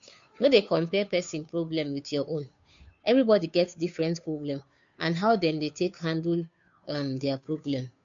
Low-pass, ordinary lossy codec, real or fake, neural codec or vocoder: 7.2 kHz; MP3, 64 kbps; fake; codec, 16 kHz, 2 kbps, FunCodec, trained on Chinese and English, 25 frames a second